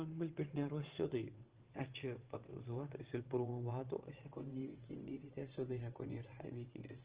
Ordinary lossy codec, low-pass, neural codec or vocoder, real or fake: Opus, 16 kbps; 3.6 kHz; vocoder, 22.05 kHz, 80 mel bands, WaveNeXt; fake